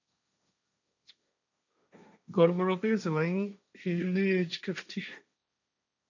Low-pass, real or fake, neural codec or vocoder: 7.2 kHz; fake; codec, 16 kHz, 1.1 kbps, Voila-Tokenizer